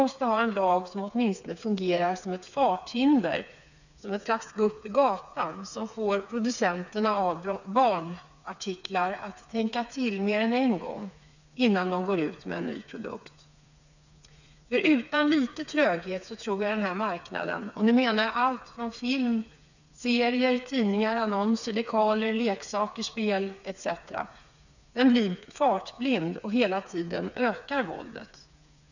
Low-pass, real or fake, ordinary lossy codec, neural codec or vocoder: 7.2 kHz; fake; none; codec, 16 kHz, 4 kbps, FreqCodec, smaller model